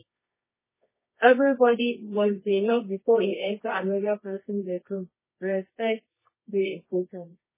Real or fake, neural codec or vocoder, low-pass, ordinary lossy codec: fake; codec, 24 kHz, 0.9 kbps, WavTokenizer, medium music audio release; 3.6 kHz; MP3, 16 kbps